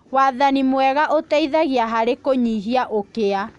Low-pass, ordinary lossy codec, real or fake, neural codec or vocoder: 10.8 kHz; none; real; none